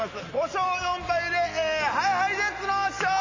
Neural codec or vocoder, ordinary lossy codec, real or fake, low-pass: none; MP3, 32 kbps; real; 7.2 kHz